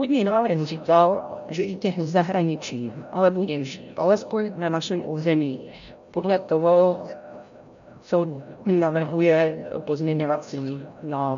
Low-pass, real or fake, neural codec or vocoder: 7.2 kHz; fake; codec, 16 kHz, 0.5 kbps, FreqCodec, larger model